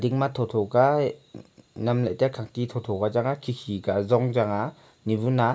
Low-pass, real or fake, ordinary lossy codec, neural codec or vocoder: none; real; none; none